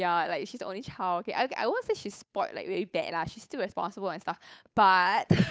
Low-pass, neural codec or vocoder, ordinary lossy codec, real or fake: none; codec, 16 kHz, 8 kbps, FunCodec, trained on Chinese and English, 25 frames a second; none; fake